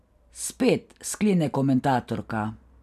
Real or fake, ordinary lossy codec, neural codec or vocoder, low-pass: real; none; none; 14.4 kHz